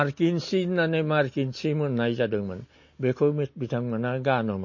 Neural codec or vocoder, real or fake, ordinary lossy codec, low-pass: none; real; MP3, 32 kbps; 7.2 kHz